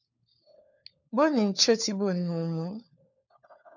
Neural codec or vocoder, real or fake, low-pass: codec, 16 kHz, 4 kbps, FunCodec, trained on LibriTTS, 50 frames a second; fake; 7.2 kHz